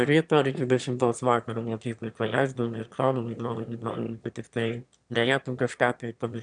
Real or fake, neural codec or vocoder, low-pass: fake; autoencoder, 22.05 kHz, a latent of 192 numbers a frame, VITS, trained on one speaker; 9.9 kHz